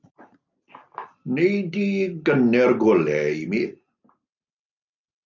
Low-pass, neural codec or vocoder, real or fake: 7.2 kHz; none; real